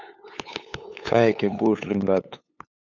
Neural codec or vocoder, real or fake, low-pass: codec, 16 kHz, 8 kbps, FunCodec, trained on LibriTTS, 25 frames a second; fake; 7.2 kHz